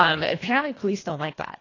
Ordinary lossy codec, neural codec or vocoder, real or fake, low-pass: AAC, 32 kbps; codec, 24 kHz, 1.5 kbps, HILCodec; fake; 7.2 kHz